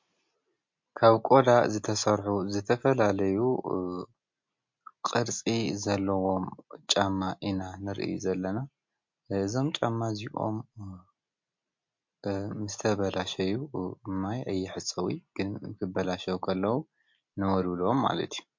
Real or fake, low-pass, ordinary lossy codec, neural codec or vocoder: real; 7.2 kHz; MP3, 48 kbps; none